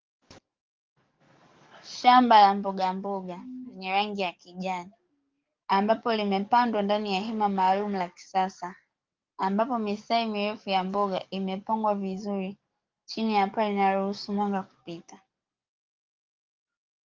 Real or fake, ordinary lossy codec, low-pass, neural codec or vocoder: fake; Opus, 24 kbps; 7.2 kHz; codec, 44.1 kHz, 7.8 kbps, DAC